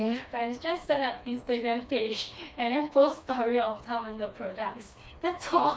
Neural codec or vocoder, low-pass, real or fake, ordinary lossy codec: codec, 16 kHz, 2 kbps, FreqCodec, smaller model; none; fake; none